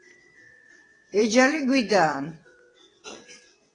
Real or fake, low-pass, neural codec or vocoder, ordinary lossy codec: fake; 9.9 kHz; vocoder, 22.05 kHz, 80 mel bands, WaveNeXt; AAC, 32 kbps